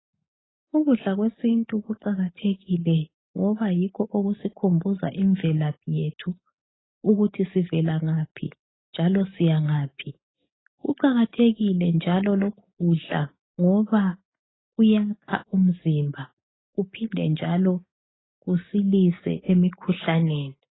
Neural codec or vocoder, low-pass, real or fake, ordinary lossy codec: none; 7.2 kHz; real; AAC, 16 kbps